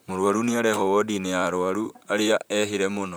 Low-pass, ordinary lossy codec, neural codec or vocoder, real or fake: none; none; vocoder, 44.1 kHz, 128 mel bands, Pupu-Vocoder; fake